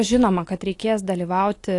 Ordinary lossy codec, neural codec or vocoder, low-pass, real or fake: AAC, 48 kbps; none; 10.8 kHz; real